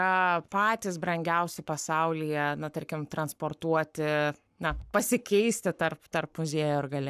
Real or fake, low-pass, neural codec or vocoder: fake; 14.4 kHz; codec, 44.1 kHz, 7.8 kbps, Pupu-Codec